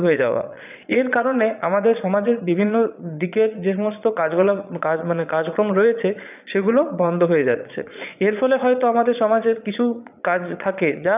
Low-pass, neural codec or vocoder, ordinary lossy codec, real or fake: 3.6 kHz; vocoder, 22.05 kHz, 80 mel bands, Vocos; none; fake